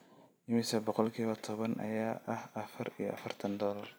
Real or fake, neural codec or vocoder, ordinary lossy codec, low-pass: fake; vocoder, 44.1 kHz, 128 mel bands every 256 samples, BigVGAN v2; none; none